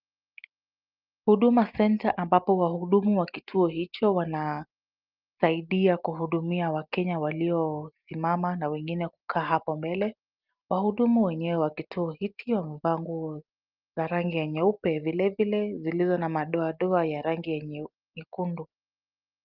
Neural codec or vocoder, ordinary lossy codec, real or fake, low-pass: none; Opus, 32 kbps; real; 5.4 kHz